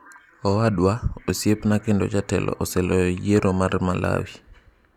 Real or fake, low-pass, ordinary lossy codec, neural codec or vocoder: real; 19.8 kHz; none; none